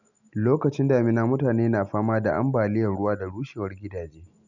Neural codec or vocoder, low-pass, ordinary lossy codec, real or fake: none; 7.2 kHz; none; real